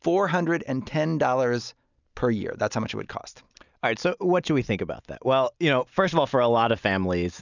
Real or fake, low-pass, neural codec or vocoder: real; 7.2 kHz; none